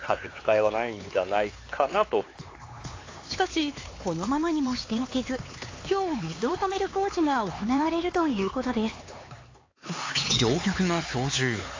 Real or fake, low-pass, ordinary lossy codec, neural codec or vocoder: fake; 7.2 kHz; AAC, 32 kbps; codec, 16 kHz, 4 kbps, X-Codec, HuBERT features, trained on LibriSpeech